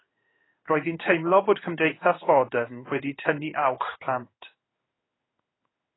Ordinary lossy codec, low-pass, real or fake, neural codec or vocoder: AAC, 16 kbps; 7.2 kHz; fake; codec, 16 kHz in and 24 kHz out, 1 kbps, XY-Tokenizer